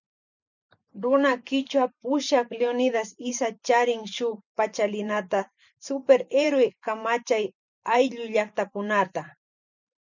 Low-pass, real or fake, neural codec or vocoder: 7.2 kHz; fake; vocoder, 44.1 kHz, 128 mel bands every 512 samples, BigVGAN v2